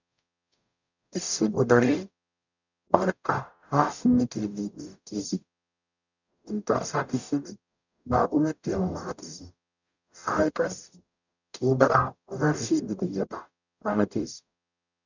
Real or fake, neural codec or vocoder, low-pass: fake; codec, 44.1 kHz, 0.9 kbps, DAC; 7.2 kHz